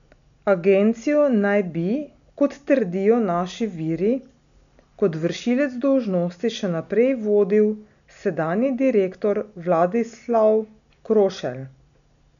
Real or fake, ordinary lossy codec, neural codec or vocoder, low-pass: real; none; none; 7.2 kHz